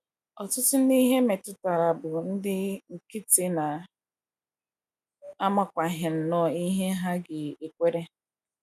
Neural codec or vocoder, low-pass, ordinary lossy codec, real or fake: none; 14.4 kHz; none; real